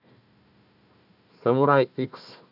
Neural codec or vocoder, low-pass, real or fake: codec, 16 kHz, 1 kbps, FunCodec, trained on Chinese and English, 50 frames a second; 5.4 kHz; fake